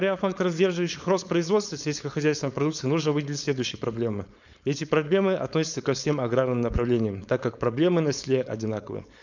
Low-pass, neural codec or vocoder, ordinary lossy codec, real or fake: 7.2 kHz; codec, 16 kHz, 4.8 kbps, FACodec; none; fake